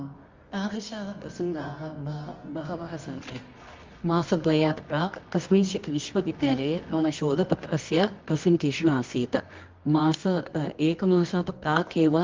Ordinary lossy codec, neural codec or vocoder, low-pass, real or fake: Opus, 32 kbps; codec, 24 kHz, 0.9 kbps, WavTokenizer, medium music audio release; 7.2 kHz; fake